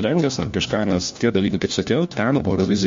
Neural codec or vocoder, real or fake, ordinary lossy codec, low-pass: codec, 16 kHz, 1 kbps, FunCodec, trained on LibriTTS, 50 frames a second; fake; MP3, 48 kbps; 7.2 kHz